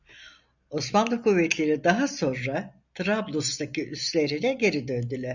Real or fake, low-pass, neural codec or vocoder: real; 7.2 kHz; none